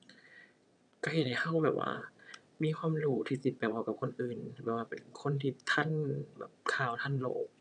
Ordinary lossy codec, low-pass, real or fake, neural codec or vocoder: none; 9.9 kHz; fake; vocoder, 22.05 kHz, 80 mel bands, WaveNeXt